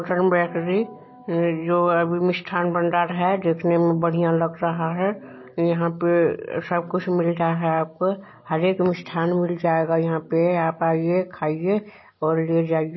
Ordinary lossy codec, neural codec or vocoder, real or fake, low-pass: MP3, 24 kbps; none; real; 7.2 kHz